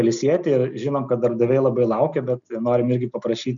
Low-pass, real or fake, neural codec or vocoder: 7.2 kHz; real; none